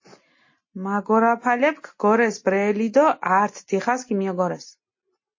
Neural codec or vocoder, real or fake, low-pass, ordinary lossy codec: none; real; 7.2 kHz; MP3, 32 kbps